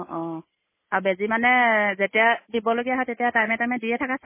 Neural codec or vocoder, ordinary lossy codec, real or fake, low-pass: none; MP3, 16 kbps; real; 3.6 kHz